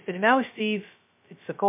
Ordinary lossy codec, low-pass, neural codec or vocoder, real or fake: MP3, 32 kbps; 3.6 kHz; codec, 16 kHz, 0.2 kbps, FocalCodec; fake